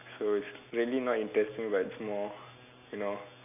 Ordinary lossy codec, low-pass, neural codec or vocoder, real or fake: none; 3.6 kHz; none; real